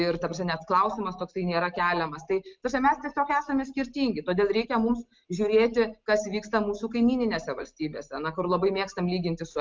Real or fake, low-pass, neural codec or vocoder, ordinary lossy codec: real; 7.2 kHz; none; Opus, 32 kbps